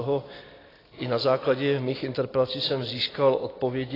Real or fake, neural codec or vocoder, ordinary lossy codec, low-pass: real; none; AAC, 24 kbps; 5.4 kHz